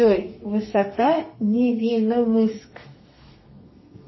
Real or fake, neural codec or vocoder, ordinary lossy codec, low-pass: fake; codec, 32 kHz, 1.9 kbps, SNAC; MP3, 24 kbps; 7.2 kHz